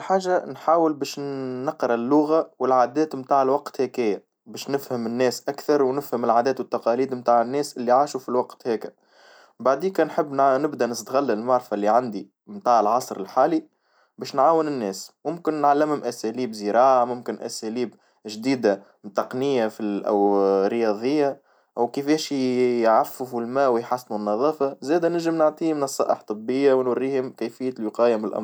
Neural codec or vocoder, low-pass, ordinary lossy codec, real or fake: none; none; none; real